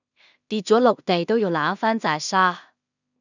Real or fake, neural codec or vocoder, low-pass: fake; codec, 16 kHz in and 24 kHz out, 0.4 kbps, LongCat-Audio-Codec, two codebook decoder; 7.2 kHz